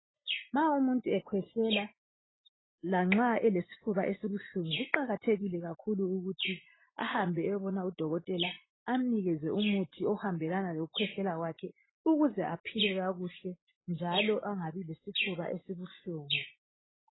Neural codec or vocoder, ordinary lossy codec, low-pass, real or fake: none; AAC, 16 kbps; 7.2 kHz; real